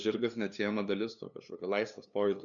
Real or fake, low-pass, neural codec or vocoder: fake; 7.2 kHz; codec, 16 kHz, 2 kbps, FunCodec, trained on LibriTTS, 25 frames a second